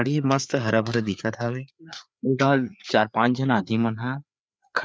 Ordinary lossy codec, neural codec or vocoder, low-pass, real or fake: none; codec, 16 kHz, 4 kbps, FreqCodec, larger model; none; fake